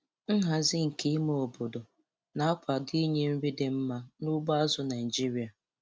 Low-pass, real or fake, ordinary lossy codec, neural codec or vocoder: none; real; none; none